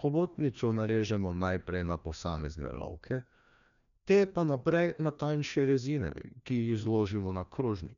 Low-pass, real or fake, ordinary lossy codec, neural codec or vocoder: 7.2 kHz; fake; none; codec, 16 kHz, 1 kbps, FreqCodec, larger model